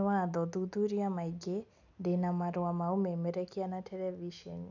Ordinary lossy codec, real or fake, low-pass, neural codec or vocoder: none; real; 7.2 kHz; none